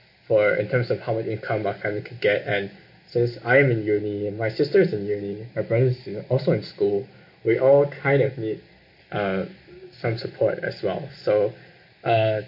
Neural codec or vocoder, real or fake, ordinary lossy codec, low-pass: none; real; MP3, 32 kbps; 5.4 kHz